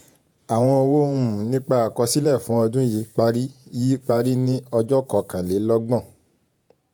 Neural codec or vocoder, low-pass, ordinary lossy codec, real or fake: vocoder, 48 kHz, 128 mel bands, Vocos; none; none; fake